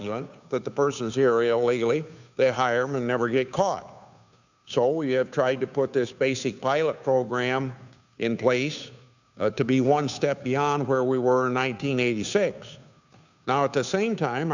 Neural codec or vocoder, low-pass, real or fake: codec, 16 kHz, 2 kbps, FunCodec, trained on Chinese and English, 25 frames a second; 7.2 kHz; fake